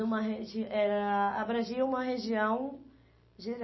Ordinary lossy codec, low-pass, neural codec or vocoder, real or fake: MP3, 24 kbps; 7.2 kHz; none; real